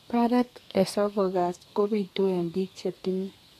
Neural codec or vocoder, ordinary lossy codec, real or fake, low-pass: codec, 32 kHz, 1.9 kbps, SNAC; AAC, 64 kbps; fake; 14.4 kHz